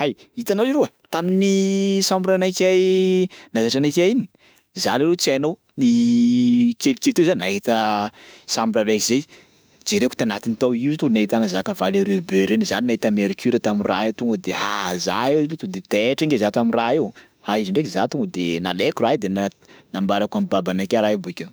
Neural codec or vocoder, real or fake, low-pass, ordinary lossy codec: autoencoder, 48 kHz, 32 numbers a frame, DAC-VAE, trained on Japanese speech; fake; none; none